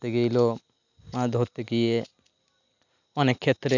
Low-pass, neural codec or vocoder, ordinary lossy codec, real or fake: 7.2 kHz; none; none; real